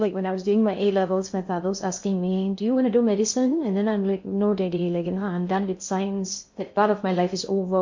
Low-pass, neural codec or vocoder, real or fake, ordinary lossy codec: 7.2 kHz; codec, 16 kHz in and 24 kHz out, 0.6 kbps, FocalCodec, streaming, 2048 codes; fake; MP3, 48 kbps